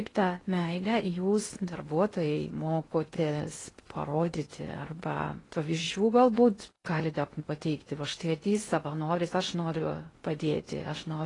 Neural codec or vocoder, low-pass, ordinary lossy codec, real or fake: codec, 16 kHz in and 24 kHz out, 0.6 kbps, FocalCodec, streaming, 2048 codes; 10.8 kHz; AAC, 32 kbps; fake